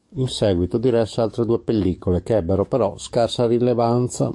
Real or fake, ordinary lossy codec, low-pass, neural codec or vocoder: fake; AAC, 64 kbps; 10.8 kHz; codec, 44.1 kHz, 7.8 kbps, DAC